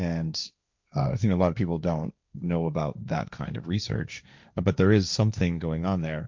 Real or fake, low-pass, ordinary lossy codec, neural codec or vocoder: fake; 7.2 kHz; MP3, 64 kbps; codec, 16 kHz, 1.1 kbps, Voila-Tokenizer